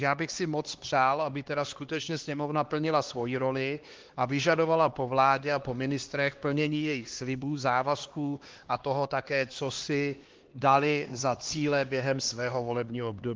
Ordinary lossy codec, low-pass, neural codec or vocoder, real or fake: Opus, 16 kbps; 7.2 kHz; codec, 16 kHz, 2 kbps, X-Codec, HuBERT features, trained on LibriSpeech; fake